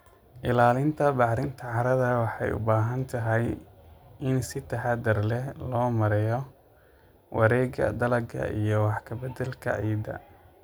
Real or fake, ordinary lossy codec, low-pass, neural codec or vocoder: real; none; none; none